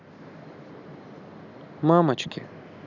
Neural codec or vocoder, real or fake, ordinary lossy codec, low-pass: none; real; none; 7.2 kHz